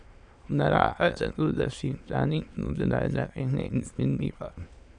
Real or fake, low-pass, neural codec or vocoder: fake; 9.9 kHz; autoencoder, 22.05 kHz, a latent of 192 numbers a frame, VITS, trained on many speakers